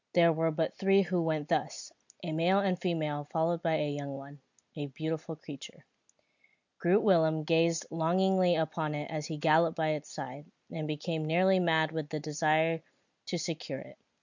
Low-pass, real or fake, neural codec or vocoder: 7.2 kHz; real; none